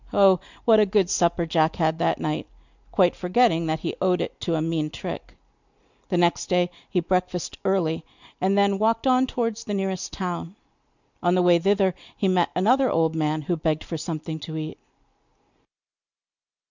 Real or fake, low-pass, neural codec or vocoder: real; 7.2 kHz; none